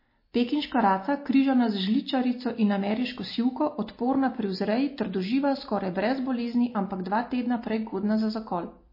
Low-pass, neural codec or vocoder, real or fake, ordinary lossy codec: 5.4 kHz; none; real; MP3, 24 kbps